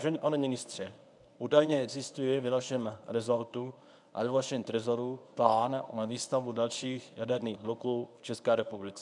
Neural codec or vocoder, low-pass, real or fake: codec, 24 kHz, 0.9 kbps, WavTokenizer, medium speech release version 1; 10.8 kHz; fake